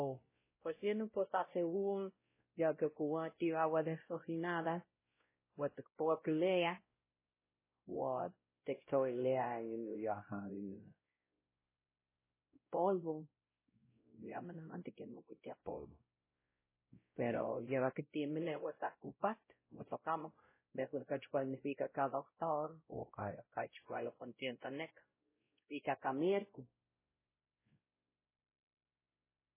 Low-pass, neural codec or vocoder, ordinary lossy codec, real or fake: 3.6 kHz; codec, 16 kHz, 0.5 kbps, X-Codec, WavLM features, trained on Multilingual LibriSpeech; MP3, 16 kbps; fake